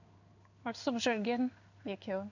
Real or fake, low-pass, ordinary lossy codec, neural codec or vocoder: fake; 7.2 kHz; none; codec, 16 kHz in and 24 kHz out, 1 kbps, XY-Tokenizer